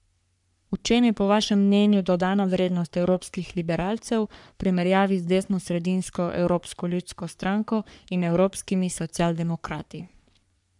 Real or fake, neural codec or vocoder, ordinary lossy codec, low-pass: fake; codec, 44.1 kHz, 3.4 kbps, Pupu-Codec; none; 10.8 kHz